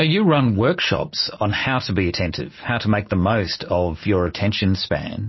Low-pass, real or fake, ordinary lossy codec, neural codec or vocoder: 7.2 kHz; real; MP3, 24 kbps; none